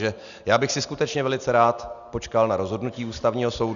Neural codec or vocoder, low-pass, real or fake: none; 7.2 kHz; real